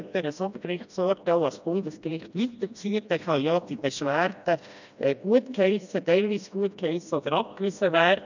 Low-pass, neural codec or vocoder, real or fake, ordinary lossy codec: 7.2 kHz; codec, 16 kHz, 1 kbps, FreqCodec, smaller model; fake; none